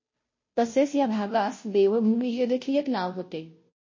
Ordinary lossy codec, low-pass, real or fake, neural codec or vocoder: MP3, 32 kbps; 7.2 kHz; fake; codec, 16 kHz, 0.5 kbps, FunCodec, trained on Chinese and English, 25 frames a second